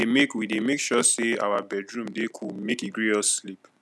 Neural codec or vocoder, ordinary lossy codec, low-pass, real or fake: none; none; none; real